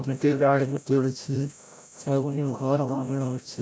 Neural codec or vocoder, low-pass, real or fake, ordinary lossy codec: codec, 16 kHz, 0.5 kbps, FreqCodec, larger model; none; fake; none